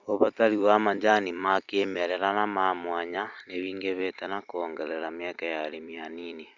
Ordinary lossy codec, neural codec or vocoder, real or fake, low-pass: Opus, 64 kbps; vocoder, 44.1 kHz, 128 mel bands every 256 samples, BigVGAN v2; fake; 7.2 kHz